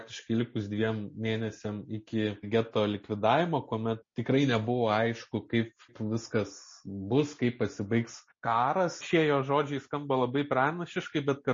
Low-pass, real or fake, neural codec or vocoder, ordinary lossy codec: 7.2 kHz; real; none; MP3, 32 kbps